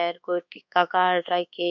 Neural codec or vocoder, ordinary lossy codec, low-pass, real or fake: codec, 24 kHz, 1.2 kbps, DualCodec; none; 7.2 kHz; fake